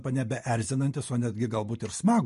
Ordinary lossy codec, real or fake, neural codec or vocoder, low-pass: MP3, 48 kbps; real; none; 14.4 kHz